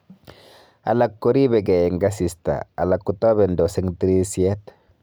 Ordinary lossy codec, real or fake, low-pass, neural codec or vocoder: none; real; none; none